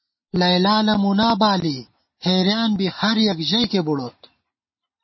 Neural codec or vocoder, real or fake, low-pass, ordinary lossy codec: none; real; 7.2 kHz; MP3, 24 kbps